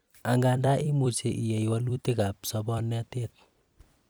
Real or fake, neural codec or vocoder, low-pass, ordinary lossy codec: fake; vocoder, 44.1 kHz, 128 mel bands every 256 samples, BigVGAN v2; none; none